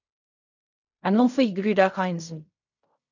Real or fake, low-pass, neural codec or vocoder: fake; 7.2 kHz; codec, 16 kHz in and 24 kHz out, 0.4 kbps, LongCat-Audio-Codec, fine tuned four codebook decoder